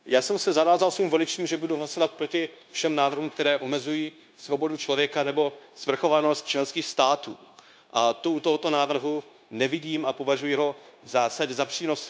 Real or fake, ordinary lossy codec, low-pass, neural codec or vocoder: fake; none; none; codec, 16 kHz, 0.9 kbps, LongCat-Audio-Codec